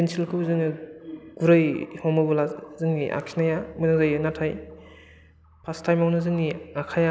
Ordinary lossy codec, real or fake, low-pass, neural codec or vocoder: none; real; none; none